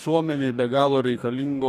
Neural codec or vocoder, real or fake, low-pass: codec, 44.1 kHz, 2.6 kbps, DAC; fake; 14.4 kHz